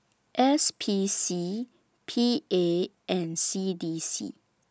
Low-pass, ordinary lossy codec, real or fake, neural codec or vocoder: none; none; real; none